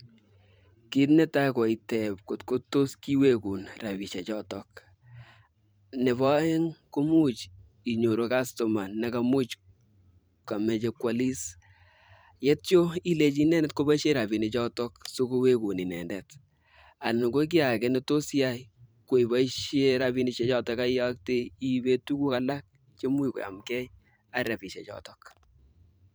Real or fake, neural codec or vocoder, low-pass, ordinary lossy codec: fake; vocoder, 44.1 kHz, 128 mel bands every 512 samples, BigVGAN v2; none; none